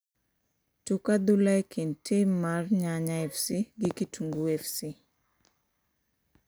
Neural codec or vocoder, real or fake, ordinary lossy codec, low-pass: none; real; none; none